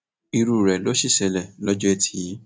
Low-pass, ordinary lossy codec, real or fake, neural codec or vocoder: none; none; real; none